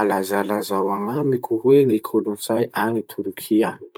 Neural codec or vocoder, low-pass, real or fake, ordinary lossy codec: vocoder, 44.1 kHz, 128 mel bands, Pupu-Vocoder; none; fake; none